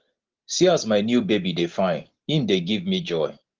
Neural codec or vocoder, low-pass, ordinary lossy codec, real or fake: none; 7.2 kHz; Opus, 16 kbps; real